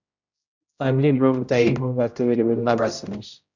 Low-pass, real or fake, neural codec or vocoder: 7.2 kHz; fake; codec, 16 kHz, 0.5 kbps, X-Codec, HuBERT features, trained on general audio